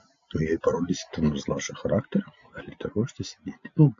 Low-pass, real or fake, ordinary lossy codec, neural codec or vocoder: 7.2 kHz; real; AAC, 48 kbps; none